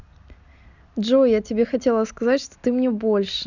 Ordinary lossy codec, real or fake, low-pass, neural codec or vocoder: none; real; 7.2 kHz; none